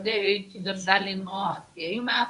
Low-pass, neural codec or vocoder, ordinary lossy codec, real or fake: 10.8 kHz; codec, 24 kHz, 0.9 kbps, WavTokenizer, medium speech release version 1; MP3, 96 kbps; fake